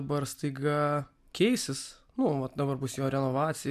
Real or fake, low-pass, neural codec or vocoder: real; 14.4 kHz; none